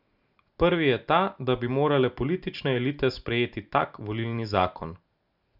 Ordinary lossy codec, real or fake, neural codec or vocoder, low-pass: none; real; none; 5.4 kHz